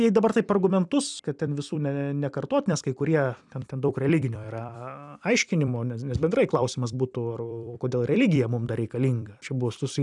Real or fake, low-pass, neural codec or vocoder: fake; 10.8 kHz; vocoder, 44.1 kHz, 128 mel bands every 256 samples, BigVGAN v2